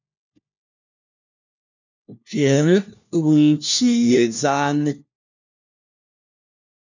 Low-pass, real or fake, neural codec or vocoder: 7.2 kHz; fake; codec, 16 kHz, 1 kbps, FunCodec, trained on LibriTTS, 50 frames a second